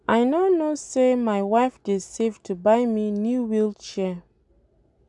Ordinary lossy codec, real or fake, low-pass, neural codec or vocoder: none; real; 10.8 kHz; none